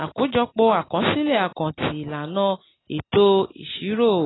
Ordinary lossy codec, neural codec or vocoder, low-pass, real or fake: AAC, 16 kbps; none; 7.2 kHz; real